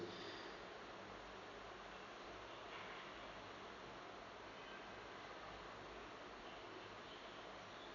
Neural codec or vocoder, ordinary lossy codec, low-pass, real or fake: none; none; 7.2 kHz; real